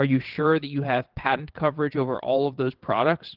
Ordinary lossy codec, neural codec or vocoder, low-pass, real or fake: Opus, 16 kbps; vocoder, 22.05 kHz, 80 mel bands, WaveNeXt; 5.4 kHz; fake